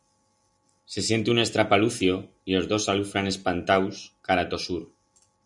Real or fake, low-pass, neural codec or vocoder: real; 10.8 kHz; none